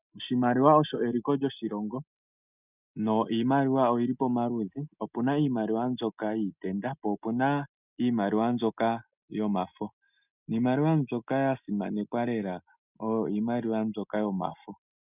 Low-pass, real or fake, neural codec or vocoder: 3.6 kHz; real; none